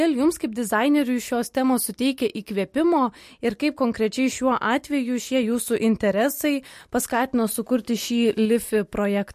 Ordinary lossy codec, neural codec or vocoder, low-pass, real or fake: MP3, 64 kbps; none; 14.4 kHz; real